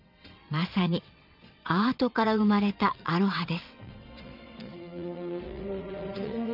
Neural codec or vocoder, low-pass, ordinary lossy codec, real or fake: vocoder, 22.05 kHz, 80 mel bands, WaveNeXt; 5.4 kHz; none; fake